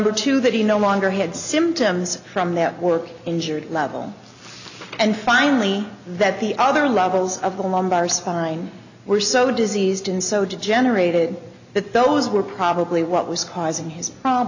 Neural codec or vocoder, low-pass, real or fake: none; 7.2 kHz; real